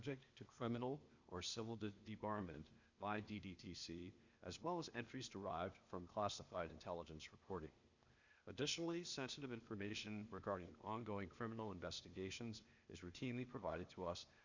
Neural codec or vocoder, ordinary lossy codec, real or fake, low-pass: codec, 16 kHz, 0.8 kbps, ZipCodec; Opus, 64 kbps; fake; 7.2 kHz